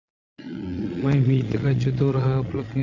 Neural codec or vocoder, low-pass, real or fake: vocoder, 22.05 kHz, 80 mel bands, Vocos; 7.2 kHz; fake